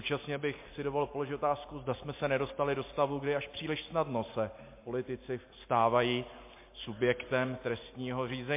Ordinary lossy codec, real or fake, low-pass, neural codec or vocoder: MP3, 24 kbps; real; 3.6 kHz; none